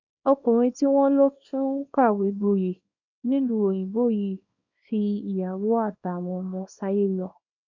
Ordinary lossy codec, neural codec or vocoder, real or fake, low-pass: none; codec, 24 kHz, 0.9 kbps, WavTokenizer, small release; fake; 7.2 kHz